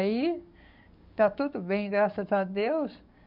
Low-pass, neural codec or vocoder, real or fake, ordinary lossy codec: 5.4 kHz; codec, 44.1 kHz, 7.8 kbps, DAC; fake; none